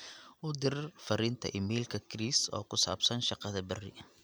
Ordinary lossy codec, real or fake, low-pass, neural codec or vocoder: none; real; none; none